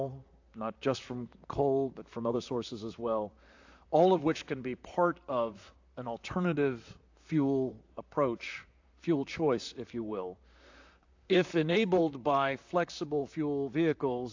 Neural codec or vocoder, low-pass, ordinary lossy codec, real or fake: vocoder, 44.1 kHz, 128 mel bands, Pupu-Vocoder; 7.2 kHz; AAC, 48 kbps; fake